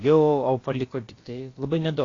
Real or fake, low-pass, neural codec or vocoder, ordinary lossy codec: fake; 7.2 kHz; codec, 16 kHz, about 1 kbps, DyCAST, with the encoder's durations; AAC, 32 kbps